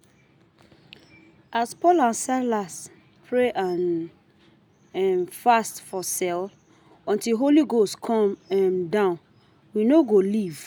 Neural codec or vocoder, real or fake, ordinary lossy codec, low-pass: none; real; none; none